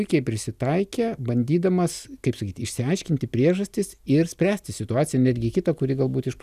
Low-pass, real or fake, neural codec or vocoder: 14.4 kHz; real; none